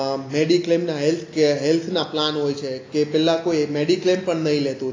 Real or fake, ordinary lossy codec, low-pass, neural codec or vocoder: real; AAC, 32 kbps; 7.2 kHz; none